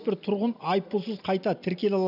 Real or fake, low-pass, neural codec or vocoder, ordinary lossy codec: real; 5.4 kHz; none; none